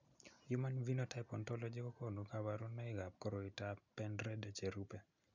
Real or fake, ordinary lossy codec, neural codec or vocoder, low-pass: real; none; none; 7.2 kHz